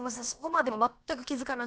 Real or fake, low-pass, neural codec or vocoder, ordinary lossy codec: fake; none; codec, 16 kHz, about 1 kbps, DyCAST, with the encoder's durations; none